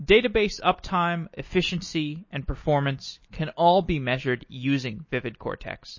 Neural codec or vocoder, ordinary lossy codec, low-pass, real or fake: none; MP3, 32 kbps; 7.2 kHz; real